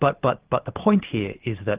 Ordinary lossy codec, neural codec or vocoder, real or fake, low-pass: Opus, 24 kbps; none; real; 3.6 kHz